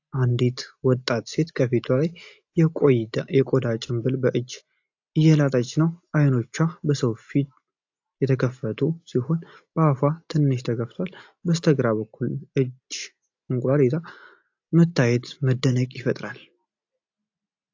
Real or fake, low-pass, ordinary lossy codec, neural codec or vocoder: real; 7.2 kHz; AAC, 48 kbps; none